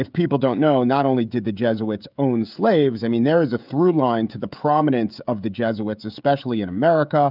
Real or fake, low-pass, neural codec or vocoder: fake; 5.4 kHz; codec, 16 kHz, 16 kbps, FreqCodec, smaller model